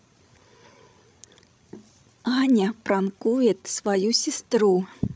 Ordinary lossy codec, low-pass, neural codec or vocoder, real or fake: none; none; codec, 16 kHz, 16 kbps, FreqCodec, larger model; fake